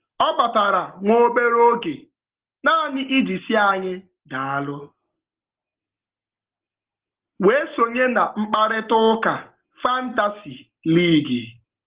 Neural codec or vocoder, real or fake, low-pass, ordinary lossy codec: none; real; 3.6 kHz; Opus, 16 kbps